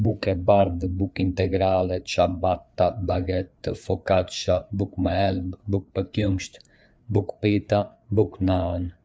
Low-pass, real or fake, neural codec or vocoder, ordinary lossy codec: none; fake; codec, 16 kHz, 4 kbps, FreqCodec, larger model; none